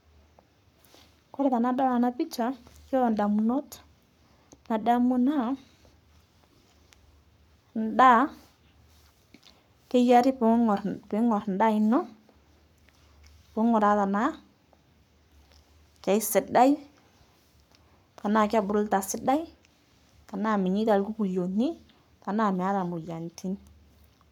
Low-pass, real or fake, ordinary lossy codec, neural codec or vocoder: 19.8 kHz; fake; none; codec, 44.1 kHz, 7.8 kbps, Pupu-Codec